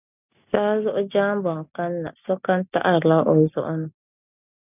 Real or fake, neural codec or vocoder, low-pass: real; none; 3.6 kHz